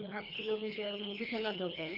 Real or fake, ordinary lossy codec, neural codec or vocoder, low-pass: fake; none; codec, 24 kHz, 3 kbps, HILCodec; 5.4 kHz